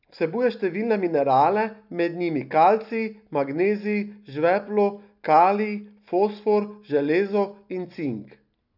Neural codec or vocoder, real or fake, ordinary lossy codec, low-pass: none; real; none; 5.4 kHz